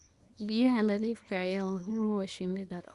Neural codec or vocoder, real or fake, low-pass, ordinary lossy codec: codec, 24 kHz, 0.9 kbps, WavTokenizer, small release; fake; 10.8 kHz; Opus, 64 kbps